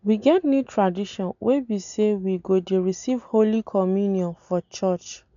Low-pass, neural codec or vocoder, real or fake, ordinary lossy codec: 7.2 kHz; none; real; none